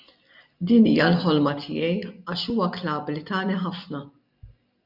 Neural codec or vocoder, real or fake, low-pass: none; real; 5.4 kHz